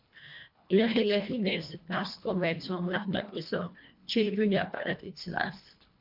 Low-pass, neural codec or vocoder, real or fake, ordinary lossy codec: 5.4 kHz; codec, 24 kHz, 1.5 kbps, HILCodec; fake; MP3, 48 kbps